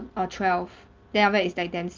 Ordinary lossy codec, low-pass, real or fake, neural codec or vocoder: Opus, 32 kbps; 7.2 kHz; real; none